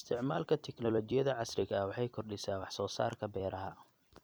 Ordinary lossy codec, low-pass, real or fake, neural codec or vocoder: none; none; fake; vocoder, 44.1 kHz, 128 mel bands every 256 samples, BigVGAN v2